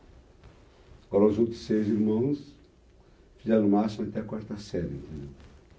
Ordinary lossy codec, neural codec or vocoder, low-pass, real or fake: none; none; none; real